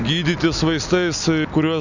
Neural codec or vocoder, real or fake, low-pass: none; real; 7.2 kHz